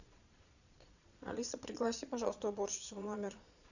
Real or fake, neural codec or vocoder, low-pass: fake; vocoder, 22.05 kHz, 80 mel bands, Vocos; 7.2 kHz